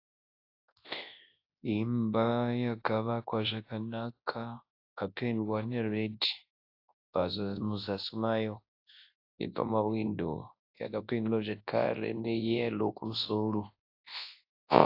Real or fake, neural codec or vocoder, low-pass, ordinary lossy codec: fake; codec, 24 kHz, 0.9 kbps, WavTokenizer, large speech release; 5.4 kHz; AAC, 32 kbps